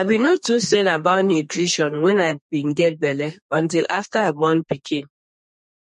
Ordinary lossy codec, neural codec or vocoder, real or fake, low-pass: MP3, 48 kbps; codec, 32 kHz, 1.9 kbps, SNAC; fake; 14.4 kHz